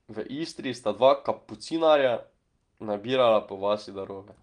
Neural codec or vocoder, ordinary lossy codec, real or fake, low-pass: none; Opus, 24 kbps; real; 9.9 kHz